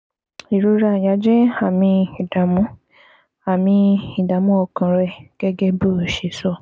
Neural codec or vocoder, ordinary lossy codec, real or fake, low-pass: none; none; real; none